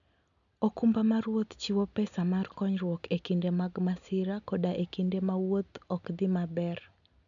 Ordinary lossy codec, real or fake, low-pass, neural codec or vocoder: none; real; 7.2 kHz; none